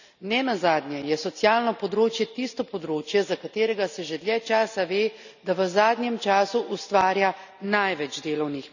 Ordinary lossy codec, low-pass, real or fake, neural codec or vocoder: none; 7.2 kHz; real; none